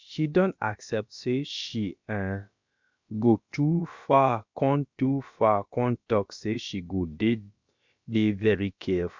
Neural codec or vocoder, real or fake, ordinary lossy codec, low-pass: codec, 16 kHz, about 1 kbps, DyCAST, with the encoder's durations; fake; MP3, 64 kbps; 7.2 kHz